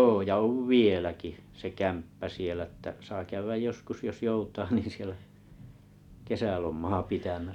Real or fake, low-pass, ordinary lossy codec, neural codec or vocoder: real; 19.8 kHz; none; none